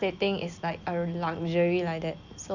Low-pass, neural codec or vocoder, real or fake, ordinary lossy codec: 7.2 kHz; none; real; none